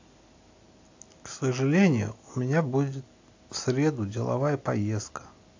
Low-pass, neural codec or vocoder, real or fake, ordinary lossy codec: 7.2 kHz; none; real; AAC, 48 kbps